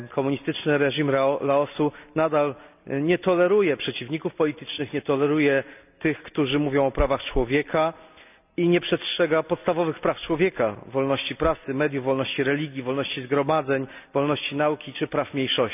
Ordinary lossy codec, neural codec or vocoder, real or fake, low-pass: none; none; real; 3.6 kHz